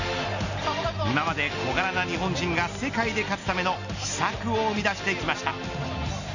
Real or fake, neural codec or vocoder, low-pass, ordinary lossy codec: real; none; 7.2 kHz; none